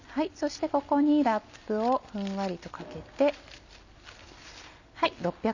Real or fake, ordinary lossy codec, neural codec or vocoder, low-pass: real; none; none; 7.2 kHz